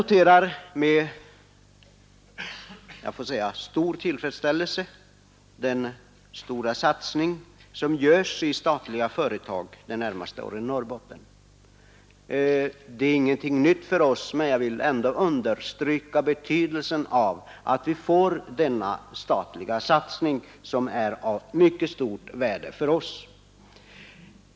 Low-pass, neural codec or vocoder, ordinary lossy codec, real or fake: none; none; none; real